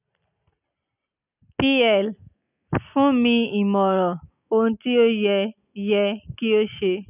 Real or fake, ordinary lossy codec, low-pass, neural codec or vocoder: real; none; 3.6 kHz; none